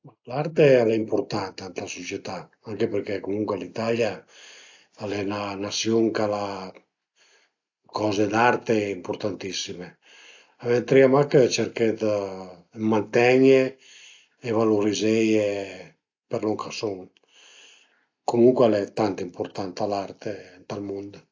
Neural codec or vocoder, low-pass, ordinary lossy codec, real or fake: none; 7.2 kHz; AAC, 48 kbps; real